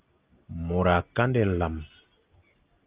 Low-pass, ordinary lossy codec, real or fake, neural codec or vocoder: 3.6 kHz; Opus, 32 kbps; real; none